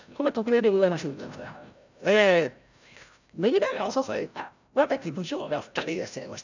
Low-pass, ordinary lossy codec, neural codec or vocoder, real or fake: 7.2 kHz; none; codec, 16 kHz, 0.5 kbps, FreqCodec, larger model; fake